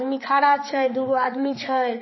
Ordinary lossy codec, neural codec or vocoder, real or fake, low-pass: MP3, 24 kbps; codec, 16 kHz, 4 kbps, X-Codec, HuBERT features, trained on balanced general audio; fake; 7.2 kHz